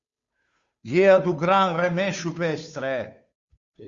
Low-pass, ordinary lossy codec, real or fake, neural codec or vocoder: 7.2 kHz; Opus, 64 kbps; fake; codec, 16 kHz, 2 kbps, FunCodec, trained on Chinese and English, 25 frames a second